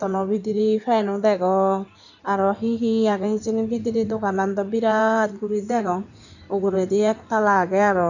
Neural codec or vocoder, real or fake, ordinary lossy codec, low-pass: codec, 16 kHz in and 24 kHz out, 2.2 kbps, FireRedTTS-2 codec; fake; none; 7.2 kHz